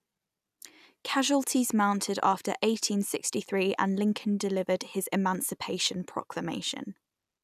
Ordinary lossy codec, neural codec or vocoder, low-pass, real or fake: none; none; 14.4 kHz; real